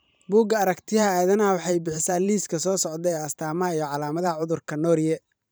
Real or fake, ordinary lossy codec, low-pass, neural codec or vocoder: fake; none; none; vocoder, 44.1 kHz, 128 mel bands every 512 samples, BigVGAN v2